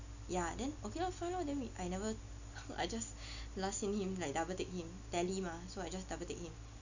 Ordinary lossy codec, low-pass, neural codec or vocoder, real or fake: none; 7.2 kHz; none; real